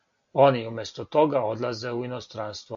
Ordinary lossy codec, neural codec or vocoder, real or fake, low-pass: AAC, 64 kbps; none; real; 7.2 kHz